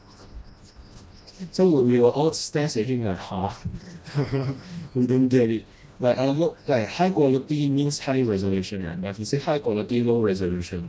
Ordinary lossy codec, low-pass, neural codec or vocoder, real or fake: none; none; codec, 16 kHz, 1 kbps, FreqCodec, smaller model; fake